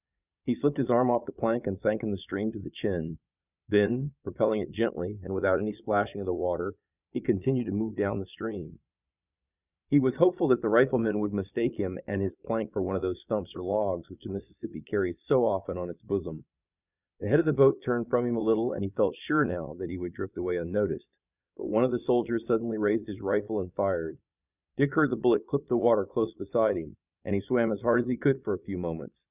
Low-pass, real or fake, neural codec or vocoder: 3.6 kHz; fake; vocoder, 22.05 kHz, 80 mel bands, Vocos